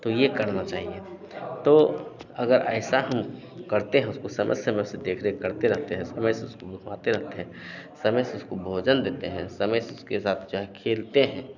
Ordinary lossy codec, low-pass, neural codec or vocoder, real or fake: none; 7.2 kHz; none; real